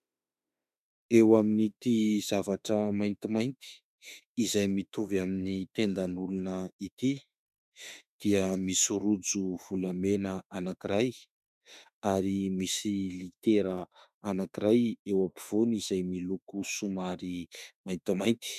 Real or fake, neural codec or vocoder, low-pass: fake; autoencoder, 48 kHz, 32 numbers a frame, DAC-VAE, trained on Japanese speech; 14.4 kHz